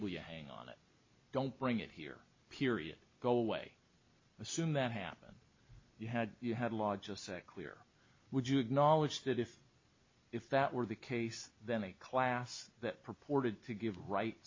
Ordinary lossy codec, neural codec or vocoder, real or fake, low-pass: MP3, 32 kbps; none; real; 7.2 kHz